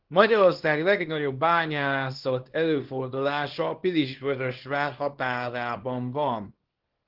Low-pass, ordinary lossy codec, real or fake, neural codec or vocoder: 5.4 kHz; Opus, 16 kbps; fake; codec, 24 kHz, 0.9 kbps, WavTokenizer, small release